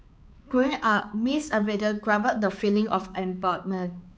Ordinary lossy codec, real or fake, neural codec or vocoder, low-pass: none; fake; codec, 16 kHz, 4 kbps, X-Codec, HuBERT features, trained on balanced general audio; none